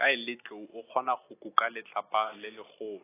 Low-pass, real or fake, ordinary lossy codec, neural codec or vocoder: 3.6 kHz; real; AAC, 16 kbps; none